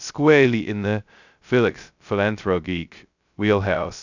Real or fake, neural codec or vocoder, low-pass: fake; codec, 16 kHz, 0.2 kbps, FocalCodec; 7.2 kHz